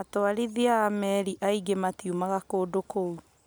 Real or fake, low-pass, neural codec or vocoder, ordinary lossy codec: real; none; none; none